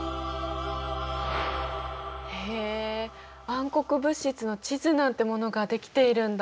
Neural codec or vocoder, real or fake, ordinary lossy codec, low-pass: none; real; none; none